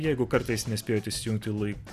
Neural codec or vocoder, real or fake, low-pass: none; real; 14.4 kHz